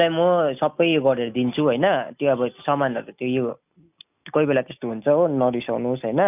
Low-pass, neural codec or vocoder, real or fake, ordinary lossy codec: 3.6 kHz; none; real; none